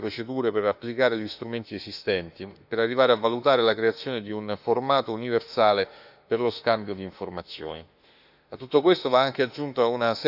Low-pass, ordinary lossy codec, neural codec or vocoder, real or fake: 5.4 kHz; none; autoencoder, 48 kHz, 32 numbers a frame, DAC-VAE, trained on Japanese speech; fake